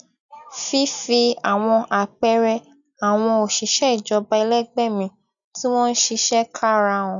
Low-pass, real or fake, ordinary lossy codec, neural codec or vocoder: 7.2 kHz; real; none; none